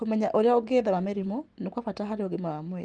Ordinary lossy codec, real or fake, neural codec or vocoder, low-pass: Opus, 24 kbps; real; none; 9.9 kHz